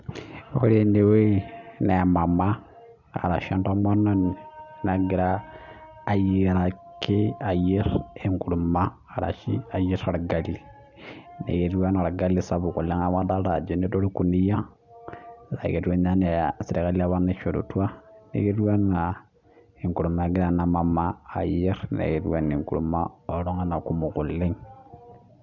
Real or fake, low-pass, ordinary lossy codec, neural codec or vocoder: real; 7.2 kHz; none; none